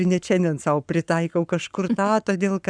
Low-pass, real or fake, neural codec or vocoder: 9.9 kHz; fake; codec, 44.1 kHz, 7.8 kbps, Pupu-Codec